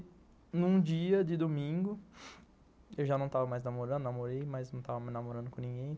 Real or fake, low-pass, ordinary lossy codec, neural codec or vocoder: real; none; none; none